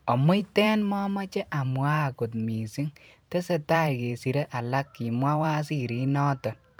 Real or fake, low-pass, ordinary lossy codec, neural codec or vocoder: fake; none; none; vocoder, 44.1 kHz, 128 mel bands every 512 samples, BigVGAN v2